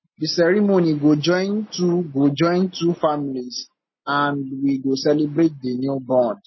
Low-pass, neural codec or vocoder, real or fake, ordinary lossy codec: 7.2 kHz; none; real; MP3, 24 kbps